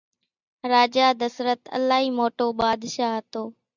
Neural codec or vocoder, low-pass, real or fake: none; 7.2 kHz; real